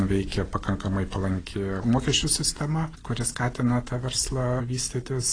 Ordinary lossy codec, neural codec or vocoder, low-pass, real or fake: AAC, 32 kbps; none; 9.9 kHz; real